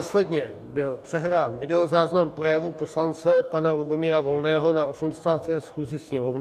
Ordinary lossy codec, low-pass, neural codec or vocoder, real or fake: MP3, 96 kbps; 14.4 kHz; codec, 44.1 kHz, 2.6 kbps, DAC; fake